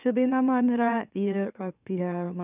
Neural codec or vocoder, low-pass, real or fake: autoencoder, 44.1 kHz, a latent of 192 numbers a frame, MeloTTS; 3.6 kHz; fake